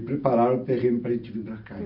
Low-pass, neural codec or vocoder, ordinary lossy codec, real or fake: 5.4 kHz; none; none; real